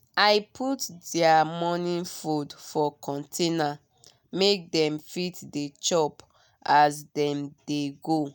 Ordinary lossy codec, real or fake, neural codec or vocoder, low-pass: none; real; none; none